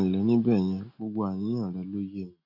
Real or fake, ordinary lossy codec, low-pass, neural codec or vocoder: real; none; 5.4 kHz; none